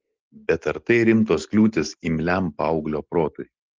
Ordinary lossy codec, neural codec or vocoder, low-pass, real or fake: Opus, 16 kbps; none; 7.2 kHz; real